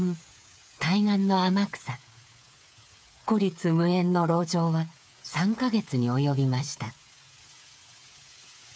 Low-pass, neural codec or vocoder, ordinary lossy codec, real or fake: none; codec, 16 kHz, 8 kbps, FreqCodec, smaller model; none; fake